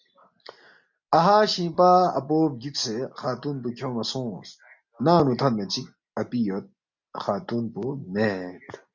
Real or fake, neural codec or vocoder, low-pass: real; none; 7.2 kHz